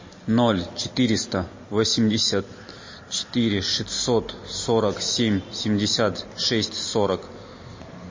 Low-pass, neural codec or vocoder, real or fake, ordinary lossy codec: 7.2 kHz; none; real; MP3, 32 kbps